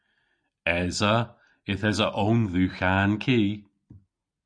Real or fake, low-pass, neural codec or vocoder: real; 9.9 kHz; none